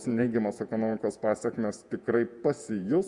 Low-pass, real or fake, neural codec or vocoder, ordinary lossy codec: 10.8 kHz; fake; vocoder, 48 kHz, 128 mel bands, Vocos; Opus, 64 kbps